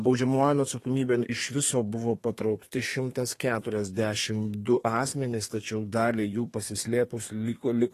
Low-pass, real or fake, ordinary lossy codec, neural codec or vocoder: 14.4 kHz; fake; AAC, 48 kbps; codec, 32 kHz, 1.9 kbps, SNAC